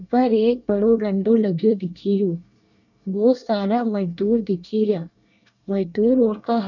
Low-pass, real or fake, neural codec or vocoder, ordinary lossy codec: 7.2 kHz; fake; codec, 24 kHz, 1 kbps, SNAC; none